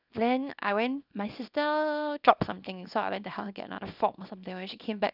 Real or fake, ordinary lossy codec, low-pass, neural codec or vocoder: fake; none; 5.4 kHz; codec, 24 kHz, 0.9 kbps, WavTokenizer, small release